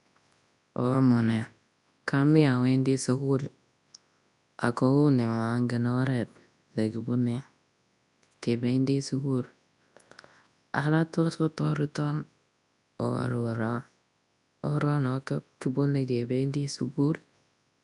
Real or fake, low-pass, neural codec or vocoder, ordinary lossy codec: fake; 10.8 kHz; codec, 24 kHz, 0.9 kbps, WavTokenizer, large speech release; none